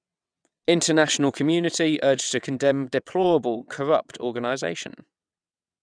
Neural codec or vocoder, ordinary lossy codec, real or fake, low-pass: vocoder, 22.05 kHz, 80 mel bands, WaveNeXt; none; fake; 9.9 kHz